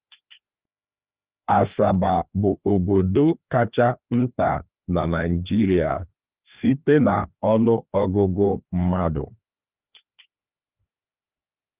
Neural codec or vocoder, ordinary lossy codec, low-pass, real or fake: codec, 16 kHz, 2 kbps, FreqCodec, larger model; Opus, 32 kbps; 3.6 kHz; fake